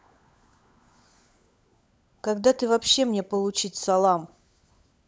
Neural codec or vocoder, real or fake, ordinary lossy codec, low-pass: codec, 16 kHz, 16 kbps, FunCodec, trained on LibriTTS, 50 frames a second; fake; none; none